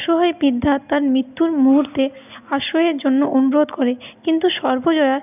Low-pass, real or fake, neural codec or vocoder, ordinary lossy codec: 3.6 kHz; real; none; none